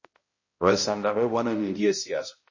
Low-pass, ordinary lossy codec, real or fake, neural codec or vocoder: 7.2 kHz; MP3, 32 kbps; fake; codec, 16 kHz, 0.5 kbps, X-Codec, HuBERT features, trained on balanced general audio